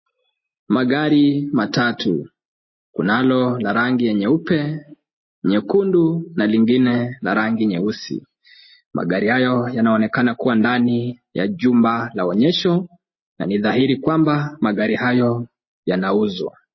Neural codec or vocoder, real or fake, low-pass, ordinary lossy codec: none; real; 7.2 kHz; MP3, 24 kbps